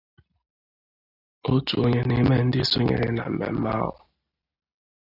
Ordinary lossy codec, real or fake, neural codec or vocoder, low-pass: AAC, 48 kbps; real; none; 5.4 kHz